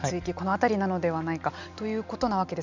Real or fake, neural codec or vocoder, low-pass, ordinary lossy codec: real; none; 7.2 kHz; none